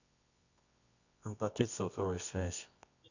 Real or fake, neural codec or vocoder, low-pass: fake; codec, 24 kHz, 0.9 kbps, WavTokenizer, medium music audio release; 7.2 kHz